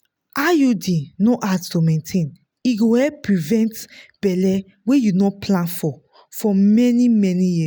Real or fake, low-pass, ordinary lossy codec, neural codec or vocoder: real; none; none; none